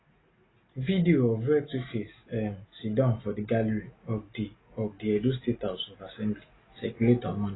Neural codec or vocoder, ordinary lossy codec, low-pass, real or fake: none; AAC, 16 kbps; 7.2 kHz; real